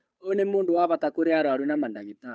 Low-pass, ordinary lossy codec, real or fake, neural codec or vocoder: none; none; fake; codec, 16 kHz, 8 kbps, FunCodec, trained on Chinese and English, 25 frames a second